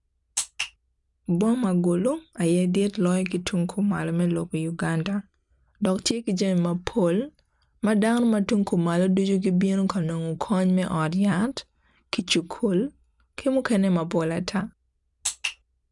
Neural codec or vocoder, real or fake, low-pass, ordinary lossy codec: none; real; 10.8 kHz; none